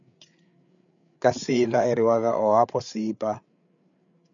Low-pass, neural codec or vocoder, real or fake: 7.2 kHz; codec, 16 kHz, 8 kbps, FreqCodec, larger model; fake